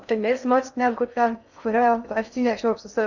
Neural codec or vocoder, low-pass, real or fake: codec, 16 kHz in and 24 kHz out, 0.6 kbps, FocalCodec, streaming, 4096 codes; 7.2 kHz; fake